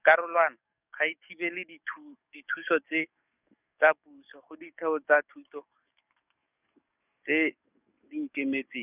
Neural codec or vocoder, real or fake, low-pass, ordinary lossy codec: codec, 44.1 kHz, 7.8 kbps, DAC; fake; 3.6 kHz; none